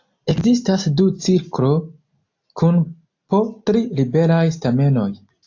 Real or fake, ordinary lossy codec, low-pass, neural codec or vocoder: real; AAC, 48 kbps; 7.2 kHz; none